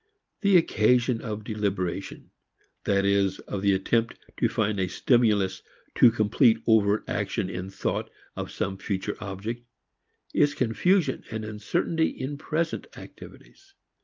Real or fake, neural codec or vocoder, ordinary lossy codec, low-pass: real; none; Opus, 24 kbps; 7.2 kHz